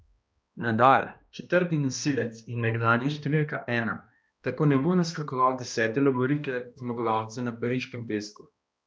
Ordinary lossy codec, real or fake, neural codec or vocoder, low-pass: none; fake; codec, 16 kHz, 1 kbps, X-Codec, HuBERT features, trained on balanced general audio; none